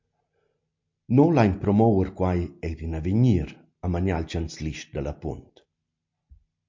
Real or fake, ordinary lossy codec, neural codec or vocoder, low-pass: real; MP3, 48 kbps; none; 7.2 kHz